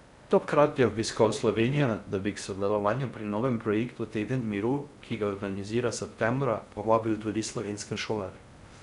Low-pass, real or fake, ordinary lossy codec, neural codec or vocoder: 10.8 kHz; fake; none; codec, 16 kHz in and 24 kHz out, 0.6 kbps, FocalCodec, streaming, 2048 codes